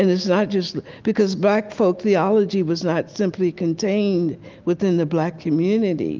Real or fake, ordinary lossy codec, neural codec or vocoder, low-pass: real; Opus, 24 kbps; none; 7.2 kHz